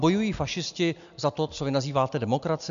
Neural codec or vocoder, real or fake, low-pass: none; real; 7.2 kHz